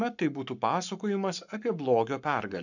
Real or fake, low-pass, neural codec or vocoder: fake; 7.2 kHz; codec, 44.1 kHz, 7.8 kbps, Pupu-Codec